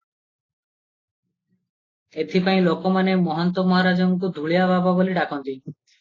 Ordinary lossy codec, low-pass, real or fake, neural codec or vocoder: AAC, 32 kbps; 7.2 kHz; real; none